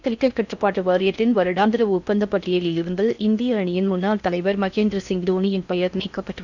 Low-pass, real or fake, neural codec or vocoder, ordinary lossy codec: 7.2 kHz; fake; codec, 16 kHz in and 24 kHz out, 0.6 kbps, FocalCodec, streaming, 4096 codes; none